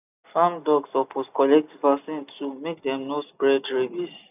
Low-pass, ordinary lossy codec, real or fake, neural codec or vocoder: 3.6 kHz; none; real; none